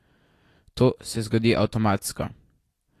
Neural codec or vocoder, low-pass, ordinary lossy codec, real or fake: none; 14.4 kHz; AAC, 48 kbps; real